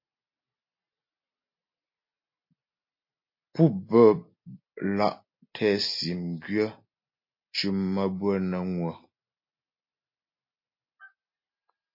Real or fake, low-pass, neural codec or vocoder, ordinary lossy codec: real; 5.4 kHz; none; MP3, 32 kbps